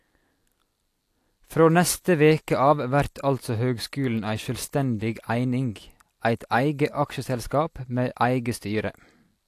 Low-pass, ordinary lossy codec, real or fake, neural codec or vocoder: 14.4 kHz; AAC, 64 kbps; real; none